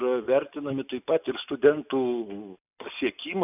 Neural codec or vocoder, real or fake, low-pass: none; real; 3.6 kHz